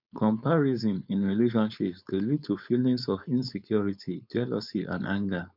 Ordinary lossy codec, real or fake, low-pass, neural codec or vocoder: none; fake; 5.4 kHz; codec, 16 kHz, 4.8 kbps, FACodec